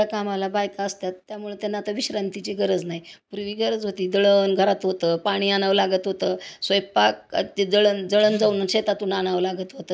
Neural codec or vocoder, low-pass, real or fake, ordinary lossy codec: none; none; real; none